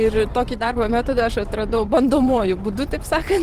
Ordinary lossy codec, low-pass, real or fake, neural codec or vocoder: Opus, 16 kbps; 14.4 kHz; fake; vocoder, 44.1 kHz, 128 mel bands every 512 samples, BigVGAN v2